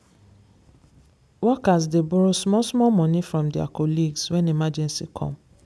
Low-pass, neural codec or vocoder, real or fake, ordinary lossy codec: none; none; real; none